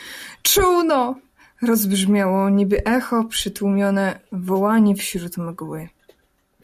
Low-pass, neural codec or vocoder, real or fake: 14.4 kHz; none; real